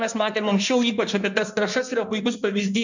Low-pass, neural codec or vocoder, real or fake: 7.2 kHz; codec, 16 kHz in and 24 kHz out, 1.1 kbps, FireRedTTS-2 codec; fake